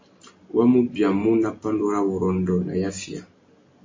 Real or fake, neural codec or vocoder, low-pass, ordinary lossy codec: real; none; 7.2 kHz; MP3, 32 kbps